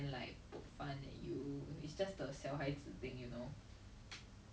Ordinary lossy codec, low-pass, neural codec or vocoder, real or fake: none; none; none; real